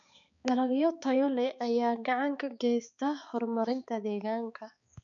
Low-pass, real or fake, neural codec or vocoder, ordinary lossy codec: 7.2 kHz; fake; codec, 16 kHz, 4 kbps, X-Codec, HuBERT features, trained on balanced general audio; none